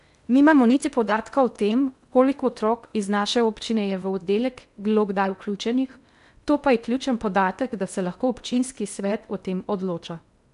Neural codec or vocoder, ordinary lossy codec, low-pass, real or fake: codec, 16 kHz in and 24 kHz out, 0.6 kbps, FocalCodec, streaming, 2048 codes; none; 10.8 kHz; fake